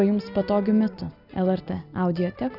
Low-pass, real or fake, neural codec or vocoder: 5.4 kHz; real; none